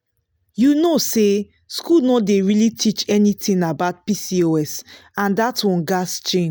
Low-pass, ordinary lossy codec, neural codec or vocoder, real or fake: none; none; none; real